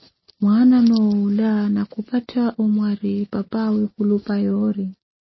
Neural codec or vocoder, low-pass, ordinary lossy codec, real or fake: none; 7.2 kHz; MP3, 24 kbps; real